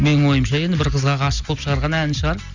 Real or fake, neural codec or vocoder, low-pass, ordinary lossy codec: real; none; 7.2 kHz; Opus, 64 kbps